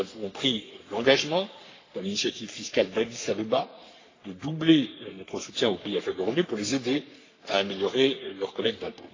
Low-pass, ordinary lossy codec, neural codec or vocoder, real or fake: 7.2 kHz; AAC, 32 kbps; codec, 44.1 kHz, 3.4 kbps, Pupu-Codec; fake